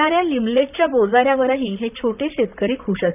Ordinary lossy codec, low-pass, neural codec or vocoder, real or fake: none; 3.6 kHz; vocoder, 44.1 kHz, 128 mel bands, Pupu-Vocoder; fake